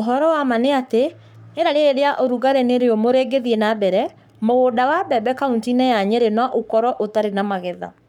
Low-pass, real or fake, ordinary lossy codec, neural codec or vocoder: 19.8 kHz; fake; none; codec, 44.1 kHz, 7.8 kbps, Pupu-Codec